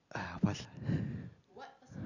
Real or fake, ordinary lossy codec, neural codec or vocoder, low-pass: real; none; none; 7.2 kHz